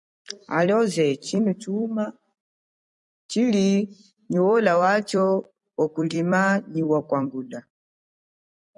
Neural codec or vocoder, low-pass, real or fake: vocoder, 44.1 kHz, 128 mel bands every 256 samples, BigVGAN v2; 10.8 kHz; fake